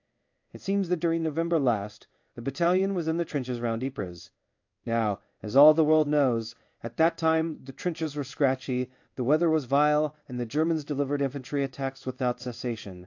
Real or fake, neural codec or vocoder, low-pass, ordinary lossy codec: fake; codec, 16 kHz in and 24 kHz out, 1 kbps, XY-Tokenizer; 7.2 kHz; AAC, 48 kbps